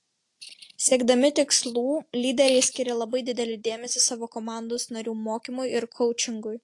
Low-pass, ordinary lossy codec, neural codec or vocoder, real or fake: 10.8 kHz; AAC, 48 kbps; none; real